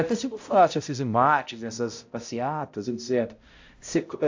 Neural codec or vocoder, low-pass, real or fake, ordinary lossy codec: codec, 16 kHz, 0.5 kbps, X-Codec, HuBERT features, trained on balanced general audio; 7.2 kHz; fake; AAC, 48 kbps